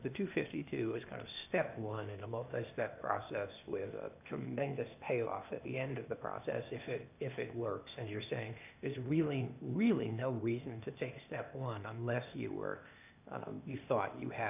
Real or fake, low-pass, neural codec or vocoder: fake; 3.6 kHz; codec, 16 kHz, 0.8 kbps, ZipCodec